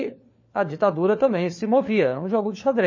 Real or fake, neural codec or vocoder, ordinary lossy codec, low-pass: fake; codec, 16 kHz, 4 kbps, FunCodec, trained on LibriTTS, 50 frames a second; MP3, 32 kbps; 7.2 kHz